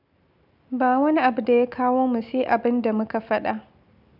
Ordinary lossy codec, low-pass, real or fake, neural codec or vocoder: none; 5.4 kHz; real; none